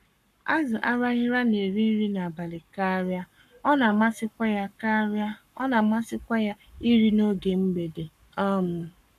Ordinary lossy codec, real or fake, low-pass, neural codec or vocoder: none; fake; 14.4 kHz; codec, 44.1 kHz, 7.8 kbps, Pupu-Codec